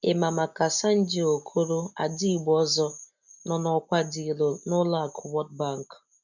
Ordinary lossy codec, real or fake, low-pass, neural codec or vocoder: none; real; 7.2 kHz; none